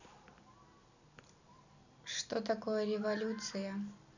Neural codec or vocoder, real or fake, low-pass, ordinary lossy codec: none; real; 7.2 kHz; none